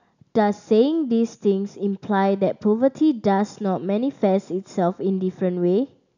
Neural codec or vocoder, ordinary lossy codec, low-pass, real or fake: none; none; 7.2 kHz; real